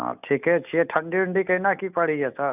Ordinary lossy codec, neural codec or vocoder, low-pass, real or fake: none; none; 3.6 kHz; real